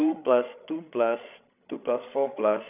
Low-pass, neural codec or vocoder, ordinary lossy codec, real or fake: 3.6 kHz; codec, 16 kHz, 8 kbps, FreqCodec, larger model; none; fake